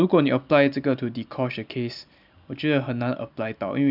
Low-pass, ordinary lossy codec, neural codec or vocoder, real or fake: 5.4 kHz; none; none; real